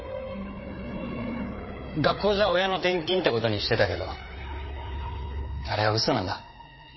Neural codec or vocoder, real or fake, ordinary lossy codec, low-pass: codec, 16 kHz, 4 kbps, FreqCodec, larger model; fake; MP3, 24 kbps; 7.2 kHz